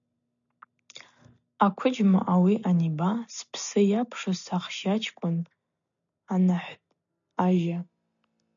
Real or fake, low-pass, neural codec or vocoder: real; 7.2 kHz; none